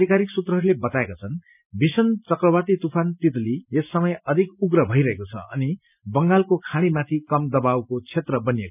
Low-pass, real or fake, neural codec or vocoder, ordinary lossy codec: 3.6 kHz; real; none; none